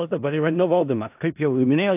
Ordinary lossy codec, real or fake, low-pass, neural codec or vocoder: AAC, 32 kbps; fake; 3.6 kHz; codec, 16 kHz in and 24 kHz out, 0.4 kbps, LongCat-Audio-Codec, four codebook decoder